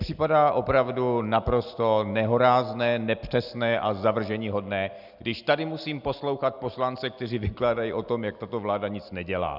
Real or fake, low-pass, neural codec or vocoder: real; 5.4 kHz; none